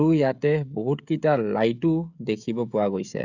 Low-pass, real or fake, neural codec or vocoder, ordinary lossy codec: 7.2 kHz; fake; codec, 16 kHz, 16 kbps, FreqCodec, smaller model; none